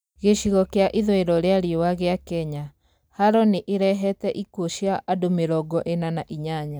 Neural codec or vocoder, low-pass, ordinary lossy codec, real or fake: none; none; none; real